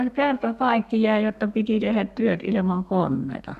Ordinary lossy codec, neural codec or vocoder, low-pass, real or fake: none; codec, 32 kHz, 1.9 kbps, SNAC; 14.4 kHz; fake